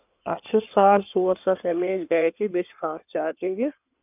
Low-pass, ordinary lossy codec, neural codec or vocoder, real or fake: 3.6 kHz; none; codec, 16 kHz in and 24 kHz out, 2.2 kbps, FireRedTTS-2 codec; fake